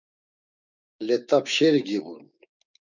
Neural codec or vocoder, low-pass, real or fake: codec, 16 kHz, 6 kbps, DAC; 7.2 kHz; fake